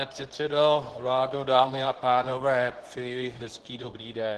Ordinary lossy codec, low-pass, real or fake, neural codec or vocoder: Opus, 16 kbps; 10.8 kHz; fake; codec, 24 kHz, 0.9 kbps, WavTokenizer, medium speech release version 2